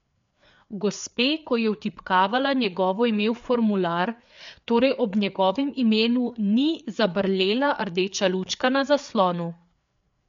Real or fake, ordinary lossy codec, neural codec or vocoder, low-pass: fake; MP3, 64 kbps; codec, 16 kHz, 4 kbps, FreqCodec, larger model; 7.2 kHz